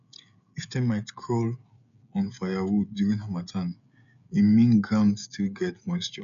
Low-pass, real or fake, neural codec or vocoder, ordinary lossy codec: 7.2 kHz; fake; codec, 16 kHz, 16 kbps, FreqCodec, smaller model; none